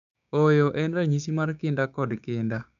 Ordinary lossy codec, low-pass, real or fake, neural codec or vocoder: none; 7.2 kHz; fake; codec, 16 kHz, 6 kbps, DAC